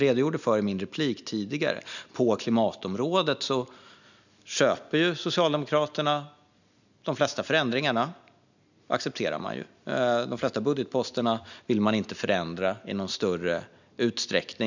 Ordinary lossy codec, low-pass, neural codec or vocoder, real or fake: none; 7.2 kHz; none; real